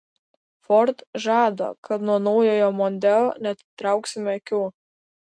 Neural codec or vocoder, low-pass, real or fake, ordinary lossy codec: none; 9.9 kHz; real; MP3, 64 kbps